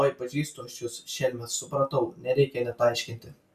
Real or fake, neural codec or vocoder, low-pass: real; none; 14.4 kHz